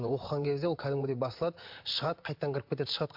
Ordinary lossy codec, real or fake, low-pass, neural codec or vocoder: MP3, 48 kbps; fake; 5.4 kHz; vocoder, 44.1 kHz, 128 mel bands, Pupu-Vocoder